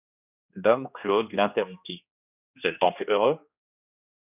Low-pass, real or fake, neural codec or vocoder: 3.6 kHz; fake; codec, 16 kHz, 1 kbps, X-Codec, HuBERT features, trained on general audio